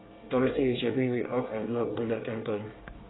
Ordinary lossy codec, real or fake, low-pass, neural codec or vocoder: AAC, 16 kbps; fake; 7.2 kHz; codec, 24 kHz, 1 kbps, SNAC